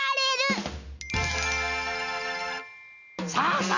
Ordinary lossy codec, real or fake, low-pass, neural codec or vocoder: none; real; 7.2 kHz; none